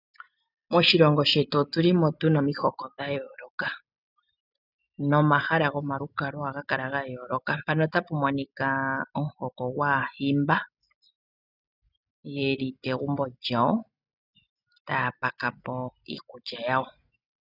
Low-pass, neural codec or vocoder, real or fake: 5.4 kHz; none; real